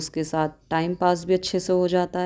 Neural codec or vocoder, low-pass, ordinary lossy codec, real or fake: none; none; none; real